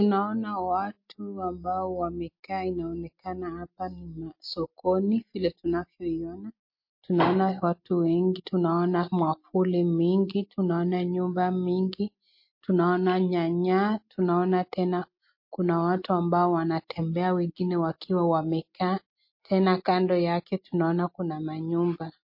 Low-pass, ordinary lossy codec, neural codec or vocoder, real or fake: 5.4 kHz; MP3, 32 kbps; none; real